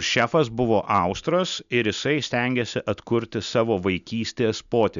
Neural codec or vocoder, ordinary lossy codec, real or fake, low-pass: none; MP3, 96 kbps; real; 7.2 kHz